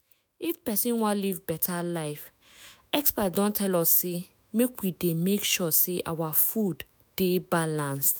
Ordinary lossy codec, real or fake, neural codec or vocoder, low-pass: none; fake; autoencoder, 48 kHz, 128 numbers a frame, DAC-VAE, trained on Japanese speech; none